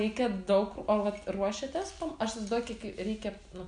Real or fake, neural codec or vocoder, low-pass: real; none; 9.9 kHz